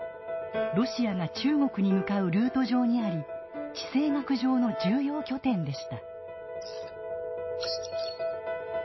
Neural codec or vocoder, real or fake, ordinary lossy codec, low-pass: none; real; MP3, 24 kbps; 7.2 kHz